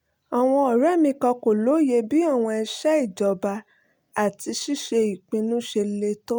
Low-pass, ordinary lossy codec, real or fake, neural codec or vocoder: none; none; real; none